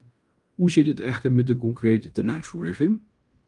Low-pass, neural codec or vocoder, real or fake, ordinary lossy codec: 10.8 kHz; codec, 16 kHz in and 24 kHz out, 0.9 kbps, LongCat-Audio-Codec, fine tuned four codebook decoder; fake; Opus, 32 kbps